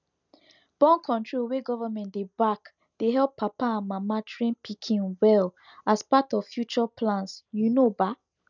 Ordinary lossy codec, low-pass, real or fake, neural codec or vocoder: none; 7.2 kHz; real; none